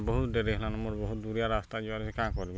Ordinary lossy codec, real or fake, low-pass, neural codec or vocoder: none; real; none; none